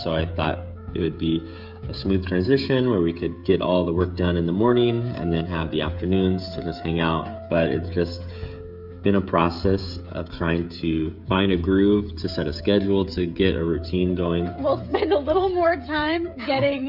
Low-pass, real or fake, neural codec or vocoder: 5.4 kHz; fake; codec, 16 kHz, 16 kbps, FreqCodec, smaller model